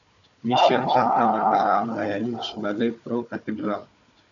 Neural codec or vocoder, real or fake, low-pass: codec, 16 kHz, 4 kbps, FunCodec, trained on Chinese and English, 50 frames a second; fake; 7.2 kHz